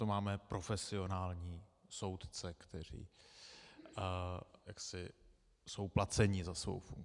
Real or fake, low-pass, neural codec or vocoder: real; 10.8 kHz; none